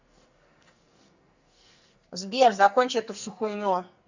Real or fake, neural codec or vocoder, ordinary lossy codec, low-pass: fake; codec, 44.1 kHz, 2.6 kbps, SNAC; Opus, 64 kbps; 7.2 kHz